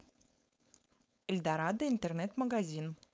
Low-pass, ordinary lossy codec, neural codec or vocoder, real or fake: none; none; codec, 16 kHz, 4.8 kbps, FACodec; fake